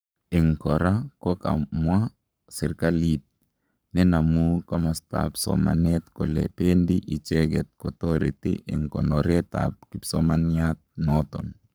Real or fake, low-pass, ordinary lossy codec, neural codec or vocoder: fake; none; none; codec, 44.1 kHz, 7.8 kbps, Pupu-Codec